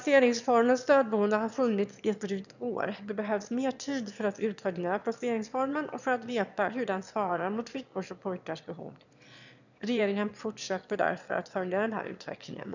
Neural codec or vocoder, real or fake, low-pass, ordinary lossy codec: autoencoder, 22.05 kHz, a latent of 192 numbers a frame, VITS, trained on one speaker; fake; 7.2 kHz; none